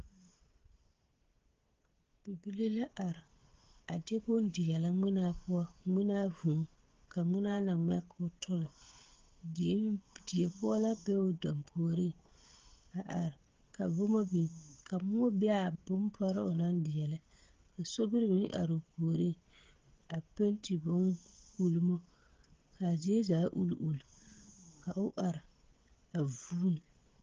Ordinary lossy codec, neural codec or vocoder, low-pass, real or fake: Opus, 16 kbps; codec, 16 kHz, 16 kbps, FreqCodec, smaller model; 7.2 kHz; fake